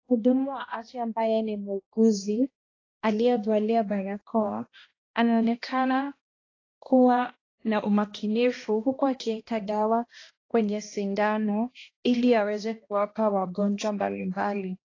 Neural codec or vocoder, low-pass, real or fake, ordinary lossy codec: codec, 16 kHz, 1 kbps, X-Codec, HuBERT features, trained on balanced general audio; 7.2 kHz; fake; AAC, 32 kbps